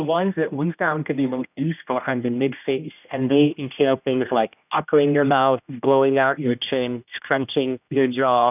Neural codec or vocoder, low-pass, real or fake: codec, 16 kHz, 1 kbps, X-Codec, HuBERT features, trained on general audio; 3.6 kHz; fake